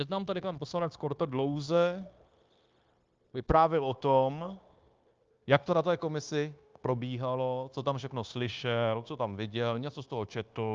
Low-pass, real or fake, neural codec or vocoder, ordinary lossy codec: 7.2 kHz; fake; codec, 16 kHz, 0.9 kbps, LongCat-Audio-Codec; Opus, 24 kbps